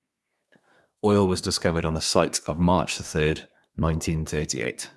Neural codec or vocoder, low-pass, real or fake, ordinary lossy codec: codec, 24 kHz, 1 kbps, SNAC; none; fake; none